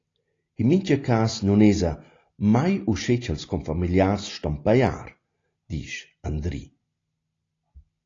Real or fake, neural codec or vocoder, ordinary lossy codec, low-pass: real; none; AAC, 32 kbps; 7.2 kHz